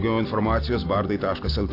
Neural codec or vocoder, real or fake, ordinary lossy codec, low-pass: none; real; AAC, 32 kbps; 5.4 kHz